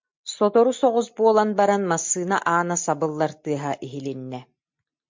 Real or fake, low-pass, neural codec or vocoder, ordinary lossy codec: real; 7.2 kHz; none; MP3, 48 kbps